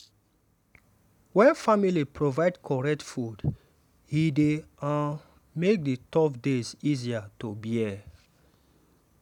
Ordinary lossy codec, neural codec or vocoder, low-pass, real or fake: none; none; 19.8 kHz; real